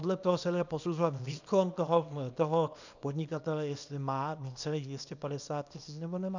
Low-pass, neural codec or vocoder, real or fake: 7.2 kHz; codec, 24 kHz, 0.9 kbps, WavTokenizer, small release; fake